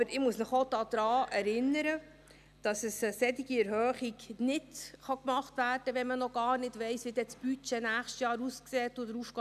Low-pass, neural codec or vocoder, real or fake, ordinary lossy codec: 14.4 kHz; none; real; none